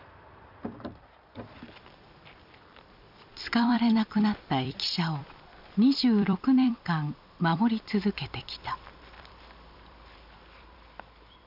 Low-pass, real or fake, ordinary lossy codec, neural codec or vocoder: 5.4 kHz; fake; none; vocoder, 44.1 kHz, 128 mel bands every 256 samples, BigVGAN v2